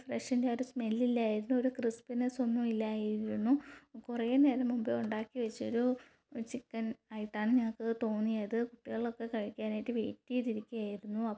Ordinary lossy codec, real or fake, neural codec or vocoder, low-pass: none; real; none; none